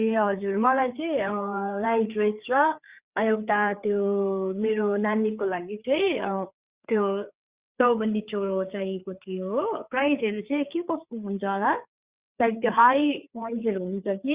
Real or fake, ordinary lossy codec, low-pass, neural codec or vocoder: fake; Opus, 64 kbps; 3.6 kHz; codec, 16 kHz, 4 kbps, FreqCodec, larger model